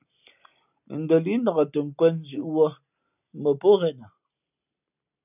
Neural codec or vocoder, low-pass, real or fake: none; 3.6 kHz; real